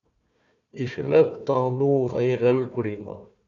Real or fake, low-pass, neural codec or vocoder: fake; 7.2 kHz; codec, 16 kHz, 1 kbps, FunCodec, trained on Chinese and English, 50 frames a second